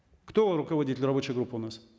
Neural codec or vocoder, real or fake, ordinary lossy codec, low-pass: none; real; none; none